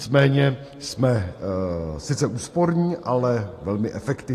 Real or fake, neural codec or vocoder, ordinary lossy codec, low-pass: real; none; AAC, 48 kbps; 14.4 kHz